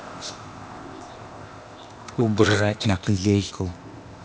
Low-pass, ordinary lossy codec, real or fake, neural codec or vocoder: none; none; fake; codec, 16 kHz, 0.8 kbps, ZipCodec